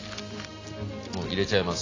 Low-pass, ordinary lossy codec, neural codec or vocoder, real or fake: 7.2 kHz; AAC, 32 kbps; none; real